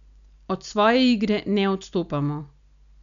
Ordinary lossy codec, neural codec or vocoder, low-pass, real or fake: none; none; 7.2 kHz; real